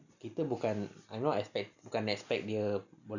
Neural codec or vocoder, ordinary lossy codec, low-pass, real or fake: none; none; 7.2 kHz; real